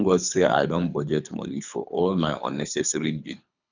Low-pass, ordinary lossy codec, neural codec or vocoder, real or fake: 7.2 kHz; none; codec, 24 kHz, 3 kbps, HILCodec; fake